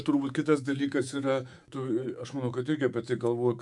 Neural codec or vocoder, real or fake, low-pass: codec, 24 kHz, 3.1 kbps, DualCodec; fake; 10.8 kHz